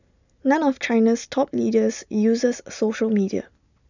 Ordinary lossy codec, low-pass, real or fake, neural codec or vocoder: none; 7.2 kHz; real; none